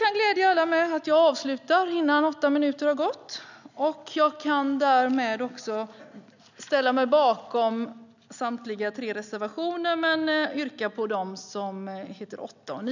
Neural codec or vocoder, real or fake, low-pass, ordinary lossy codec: none; real; 7.2 kHz; none